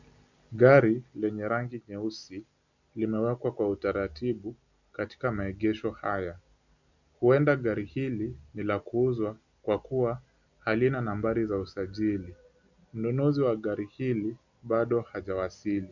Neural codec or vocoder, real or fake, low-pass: none; real; 7.2 kHz